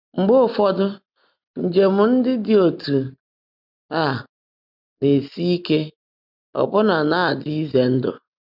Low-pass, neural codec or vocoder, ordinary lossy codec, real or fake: 5.4 kHz; none; none; real